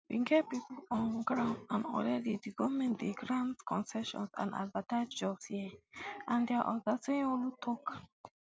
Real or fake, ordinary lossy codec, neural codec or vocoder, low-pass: real; none; none; none